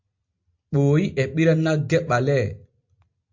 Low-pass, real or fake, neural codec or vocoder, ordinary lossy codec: 7.2 kHz; real; none; MP3, 48 kbps